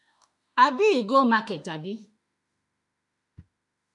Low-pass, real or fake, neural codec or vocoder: 10.8 kHz; fake; autoencoder, 48 kHz, 32 numbers a frame, DAC-VAE, trained on Japanese speech